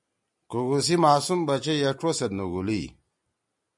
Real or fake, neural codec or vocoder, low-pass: real; none; 10.8 kHz